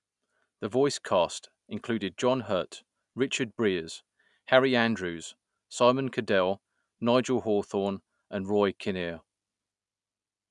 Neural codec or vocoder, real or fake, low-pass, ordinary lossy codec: none; real; 10.8 kHz; none